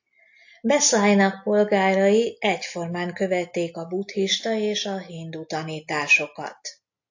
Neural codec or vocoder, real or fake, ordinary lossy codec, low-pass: none; real; AAC, 48 kbps; 7.2 kHz